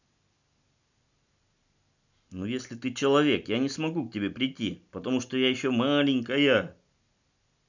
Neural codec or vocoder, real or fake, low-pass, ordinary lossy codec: none; real; 7.2 kHz; none